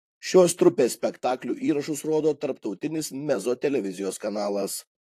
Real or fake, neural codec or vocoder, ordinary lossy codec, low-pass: fake; vocoder, 44.1 kHz, 128 mel bands, Pupu-Vocoder; AAC, 64 kbps; 14.4 kHz